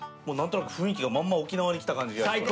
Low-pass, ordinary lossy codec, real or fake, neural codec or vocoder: none; none; real; none